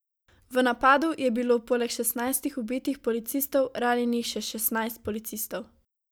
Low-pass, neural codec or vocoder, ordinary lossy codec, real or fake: none; none; none; real